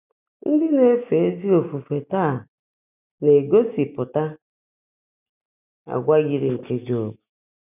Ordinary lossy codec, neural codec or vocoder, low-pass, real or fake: none; none; 3.6 kHz; real